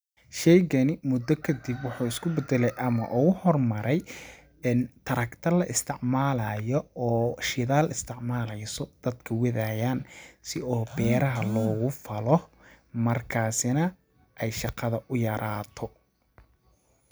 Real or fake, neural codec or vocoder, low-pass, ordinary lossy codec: real; none; none; none